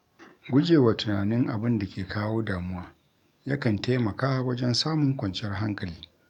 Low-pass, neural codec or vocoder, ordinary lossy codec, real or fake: 19.8 kHz; codec, 44.1 kHz, 7.8 kbps, DAC; none; fake